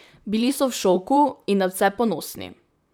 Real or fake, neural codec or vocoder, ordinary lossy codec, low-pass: fake; vocoder, 44.1 kHz, 128 mel bands, Pupu-Vocoder; none; none